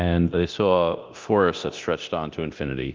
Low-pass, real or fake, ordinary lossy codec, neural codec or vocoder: 7.2 kHz; fake; Opus, 32 kbps; codec, 24 kHz, 0.9 kbps, DualCodec